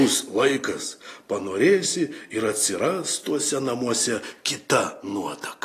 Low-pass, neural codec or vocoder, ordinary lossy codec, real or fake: 14.4 kHz; none; AAC, 48 kbps; real